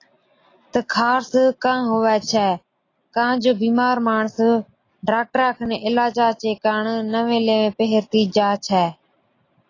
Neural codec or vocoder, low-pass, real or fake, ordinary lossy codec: none; 7.2 kHz; real; AAC, 32 kbps